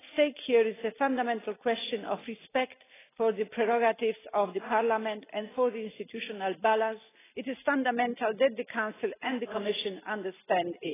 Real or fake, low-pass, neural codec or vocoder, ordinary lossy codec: real; 3.6 kHz; none; AAC, 16 kbps